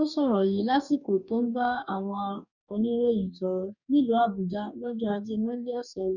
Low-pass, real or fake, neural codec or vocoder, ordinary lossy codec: 7.2 kHz; fake; codec, 44.1 kHz, 2.6 kbps, DAC; none